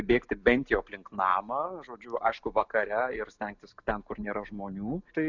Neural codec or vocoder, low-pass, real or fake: none; 7.2 kHz; real